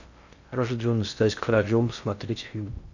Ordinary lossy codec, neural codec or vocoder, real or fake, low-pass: none; codec, 16 kHz in and 24 kHz out, 0.6 kbps, FocalCodec, streaming, 2048 codes; fake; 7.2 kHz